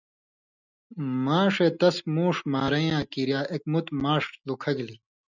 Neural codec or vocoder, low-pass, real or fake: none; 7.2 kHz; real